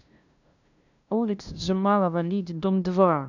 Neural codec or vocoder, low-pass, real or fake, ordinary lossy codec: codec, 16 kHz, 0.5 kbps, FunCodec, trained on LibriTTS, 25 frames a second; 7.2 kHz; fake; none